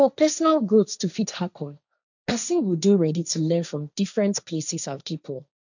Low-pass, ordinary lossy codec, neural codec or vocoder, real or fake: 7.2 kHz; none; codec, 16 kHz, 1.1 kbps, Voila-Tokenizer; fake